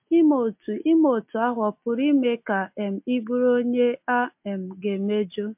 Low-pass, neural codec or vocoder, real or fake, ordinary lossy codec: 3.6 kHz; none; real; MP3, 32 kbps